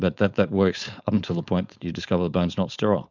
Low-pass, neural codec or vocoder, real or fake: 7.2 kHz; codec, 16 kHz, 4.8 kbps, FACodec; fake